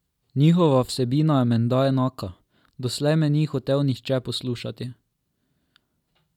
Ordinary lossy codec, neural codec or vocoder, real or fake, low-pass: none; none; real; 19.8 kHz